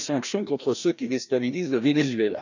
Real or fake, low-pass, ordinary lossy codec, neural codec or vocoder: fake; 7.2 kHz; none; codec, 16 kHz, 1 kbps, FreqCodec, larger model